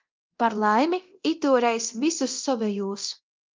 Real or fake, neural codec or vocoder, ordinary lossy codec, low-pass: fake; codec, 24 kHz, 0.9 kbps, DualCodec; Opus, 32 kbps; 7.2 kHz